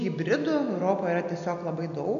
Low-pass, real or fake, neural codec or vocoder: 7.2 kHz; real; none